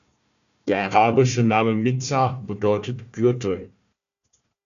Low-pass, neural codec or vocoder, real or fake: 7.2 kHz; codec, 16 kHz, 1 kbps, FunCodec, trained on Chinese and English, 50 frames a second; fake